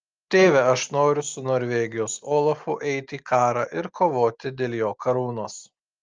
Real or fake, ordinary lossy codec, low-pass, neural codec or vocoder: real; Opus, 24 kbps; 7.2 kHz; none